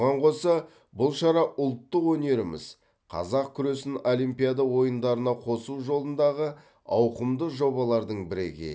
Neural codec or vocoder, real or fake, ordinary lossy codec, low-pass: none; real; none; none